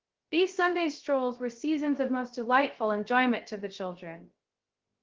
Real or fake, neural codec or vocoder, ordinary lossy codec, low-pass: fake; codec, 16 kHz, 0.3 kbps, FocalCodec; Opus, 16 kbps; 7.2 kHz